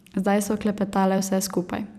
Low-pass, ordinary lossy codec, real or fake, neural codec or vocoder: 14.4 kHz; none; fake; vocoder, 44.1 kHz, 128 mel bands every 256 samples, BigVGAN v2